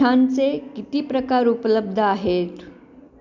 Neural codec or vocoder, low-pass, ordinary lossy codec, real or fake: none; 7.2 kHz; none; real